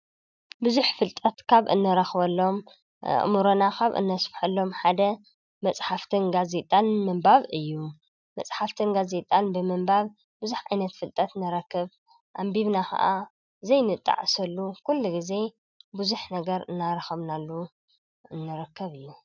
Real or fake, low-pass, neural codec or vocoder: real; 7.2 kHz; none